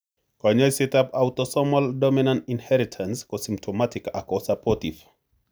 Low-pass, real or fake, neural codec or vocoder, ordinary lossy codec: none; real; none; none